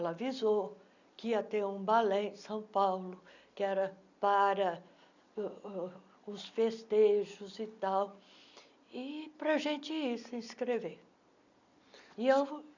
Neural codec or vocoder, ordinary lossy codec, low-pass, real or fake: none; none; 7.2 kHz; real